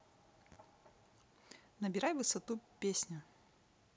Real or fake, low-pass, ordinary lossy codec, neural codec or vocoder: real; none; none; none